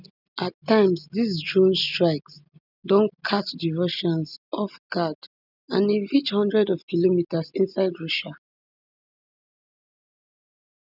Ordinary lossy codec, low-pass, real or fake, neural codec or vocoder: none; 5.4 kHz; real; none